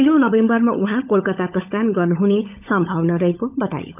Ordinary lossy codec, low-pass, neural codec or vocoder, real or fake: none; 3.6 kHz; codec, 16 kHz, 16 kbps, FunCodec, trained on LibriTTS, 50 frames a second; fake